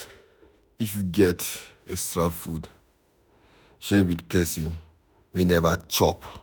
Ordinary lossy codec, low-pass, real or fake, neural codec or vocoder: none; none; fake; autoencoder, 48 kHz, 32 numbers a frame, DAC-VAE, trained on Japanese speech